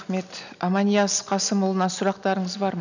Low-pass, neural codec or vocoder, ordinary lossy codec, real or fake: 7.2 kHz; none; none; real